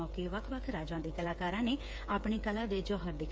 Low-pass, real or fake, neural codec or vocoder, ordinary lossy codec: none; fake; codec, 16 kHz, 8 kbps, FreqCodec, smaller model; none